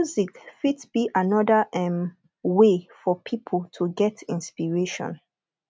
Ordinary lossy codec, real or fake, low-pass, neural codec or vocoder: none; real; none; none